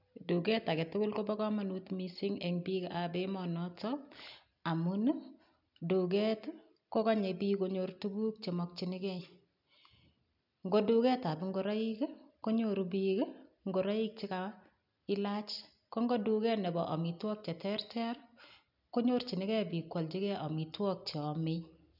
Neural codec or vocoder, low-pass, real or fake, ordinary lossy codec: none; 5.4 kHz; real; none